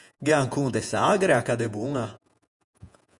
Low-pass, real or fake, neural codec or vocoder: 10.8 kHz; fake; vocoder, 48 kHz, 128 mel bands, Vocos